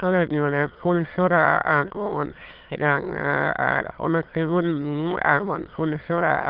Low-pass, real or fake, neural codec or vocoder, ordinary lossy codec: 5.4 kHz; fake; autoencoder, 22.05 kHz, a latent of 192 numbers a frame, VITS, trained on many speakers; Opus, 32 kbps